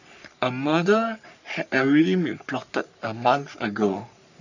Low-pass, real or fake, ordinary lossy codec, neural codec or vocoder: 7.2 kHz; fake; none; codec, 44.1 kHz, 3.4 kbps, Pupu-Codec